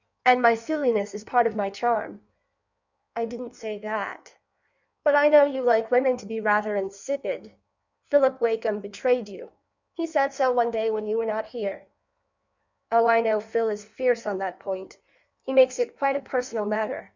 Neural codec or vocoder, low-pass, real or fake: codec, 16 kHz in and 24 kHz out, 1.1 kbps, FireRedTTS-2 codec; 7.2 kHz; fake